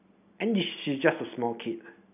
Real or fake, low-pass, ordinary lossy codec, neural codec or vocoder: real; 3.6 kHz; none; none